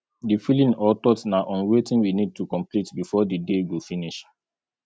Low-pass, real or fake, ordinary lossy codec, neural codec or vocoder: none; real; none; none